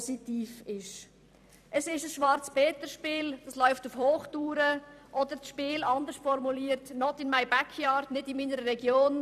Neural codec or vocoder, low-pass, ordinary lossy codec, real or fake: vocoder, 44.1 kHz, 128 mel bands every 256 samples, BigVGAN v2; 14.4 kHz; none; fake